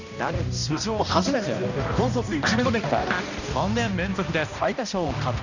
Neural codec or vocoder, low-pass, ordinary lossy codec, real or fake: codec, 16 kHz, 1 kbps, X-Codec, HuBERT features, trained on balanced general audio; 7.2 kHz; none; fake